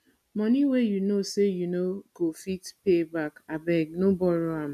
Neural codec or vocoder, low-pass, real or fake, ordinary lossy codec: none; 14.4 kHz; real; none